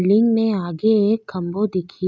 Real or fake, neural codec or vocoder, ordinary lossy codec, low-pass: real; none; none; none